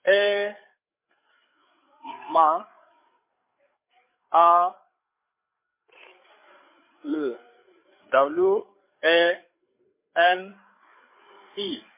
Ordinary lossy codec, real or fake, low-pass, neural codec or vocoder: MP3, 24 kbps; fake; 3.6 kHz; codec, 16 kHz, 8 kbps, FreqCodec, larger model